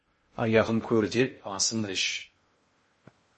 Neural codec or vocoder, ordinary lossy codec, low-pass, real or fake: codec, 16 kHz in and 24 kHz out, 0.6 kbps, FocalCodec, streaming, 2048 codes; MP3, 32 kbps; 10.8 kHz; fake